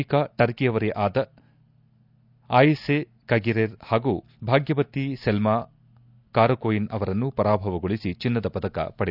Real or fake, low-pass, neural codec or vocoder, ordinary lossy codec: real; 5.4 kHz; none; none